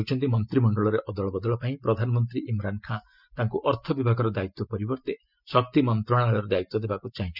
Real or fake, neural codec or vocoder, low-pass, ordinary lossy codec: real; none; 5.4 kHz; none